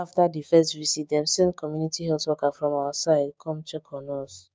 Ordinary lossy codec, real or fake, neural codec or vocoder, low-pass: none; fake; codec, 16 kHz, 6 kbps, DAC; none